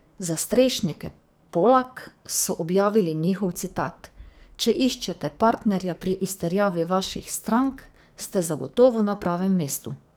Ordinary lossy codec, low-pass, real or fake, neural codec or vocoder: none; none; fake; codec, 44.1 kHz, 2.6 kbps, SNAC